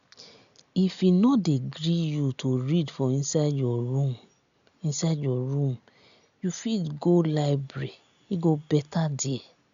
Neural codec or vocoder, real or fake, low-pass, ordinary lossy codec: none; real; 7.2 kHz; none